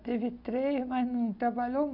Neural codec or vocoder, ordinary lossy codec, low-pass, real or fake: none; none; 5.4 kHz; real